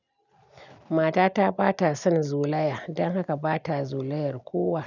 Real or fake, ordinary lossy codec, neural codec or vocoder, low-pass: real; none; none; 7.2 kHz